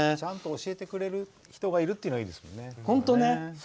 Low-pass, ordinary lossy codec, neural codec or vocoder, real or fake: none; none; none; real